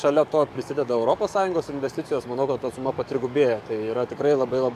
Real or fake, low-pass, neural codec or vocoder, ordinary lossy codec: fake; 14.4 kHz; codec, 44.1 kHz, 7.8 kbps, DAC; AAC, 96 kbps